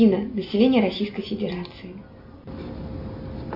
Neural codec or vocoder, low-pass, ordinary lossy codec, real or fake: none; 5.4 kHz; MP3, 48 kbps; real